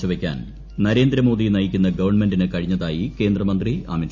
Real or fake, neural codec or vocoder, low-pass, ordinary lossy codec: real; none; 7.2 kHz; none